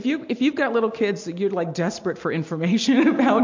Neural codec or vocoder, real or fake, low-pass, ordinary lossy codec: none; real; 7.2 kHz; MP3, 48 kbps